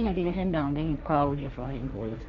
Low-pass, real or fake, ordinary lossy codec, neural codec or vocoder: 7.2 kHz; fake; none; codec, 16 kHz, 1.1 kbps, Voila-Tokenizer